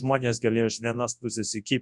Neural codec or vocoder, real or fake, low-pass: codec, 24 kHz, 0.9 kbps, WavTokenizer, large speech release; fake; 10.8 kHz